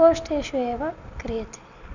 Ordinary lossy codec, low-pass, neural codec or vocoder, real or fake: none; 7.2 kHz; none; real